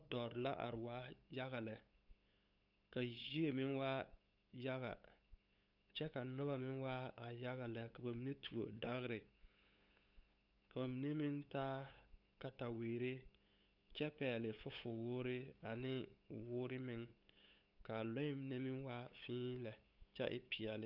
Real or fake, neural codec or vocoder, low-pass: fake; codec, 16 kHz, 8 kbps, FunCodec, trained on LibriTTS, 25 frames a second; 5.4 kHz